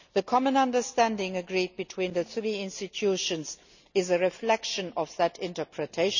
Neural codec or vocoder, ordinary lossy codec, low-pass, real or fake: none; none; 7.2 kHz; real